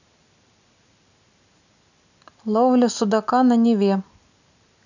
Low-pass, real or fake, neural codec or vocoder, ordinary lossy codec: 7.2 kHz; real; none; none